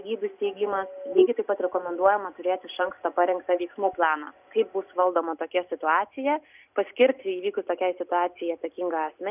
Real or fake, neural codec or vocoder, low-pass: real; none; 3.6 kHz